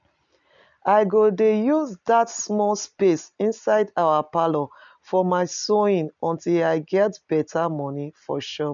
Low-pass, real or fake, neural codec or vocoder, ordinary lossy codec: 7.2 kHz; real; none; none